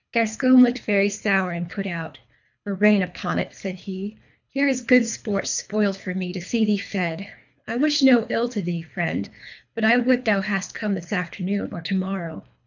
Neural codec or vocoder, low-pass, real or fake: codec, 24 kHz, 3 kbps, HILCodec; 7.2 kHz; fake